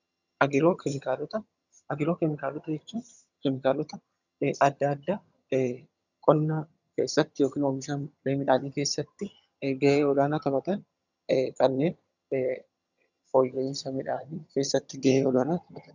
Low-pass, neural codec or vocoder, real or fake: 7.2 kHz; vocoder, 22.05 kHz, 80 mel bands, HiFi-GAN; fake